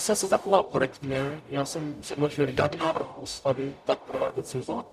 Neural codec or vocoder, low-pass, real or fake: codec, 44.1 kHz, 0.9 kbps, DAC; 14.4 kHz; fake